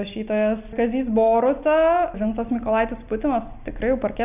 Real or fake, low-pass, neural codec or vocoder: real; 3.6 kHz; none